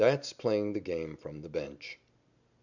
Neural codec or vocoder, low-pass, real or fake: none; 7.2 kHz; real